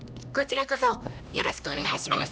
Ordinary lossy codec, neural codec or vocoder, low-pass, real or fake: none; codec, 16 kHz, 1 kbps, X-Codec, HuBERT features, trained on LibriSpeech; none; fake